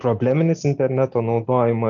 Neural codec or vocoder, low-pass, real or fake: none; 7.2 kHz; real